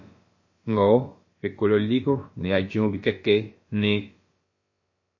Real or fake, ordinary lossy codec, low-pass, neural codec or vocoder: fake; MP3, 32 kbps; 7.2 kHz; codec, 16 kHz, about 1 kbps, DyCAST, with the encoder's durations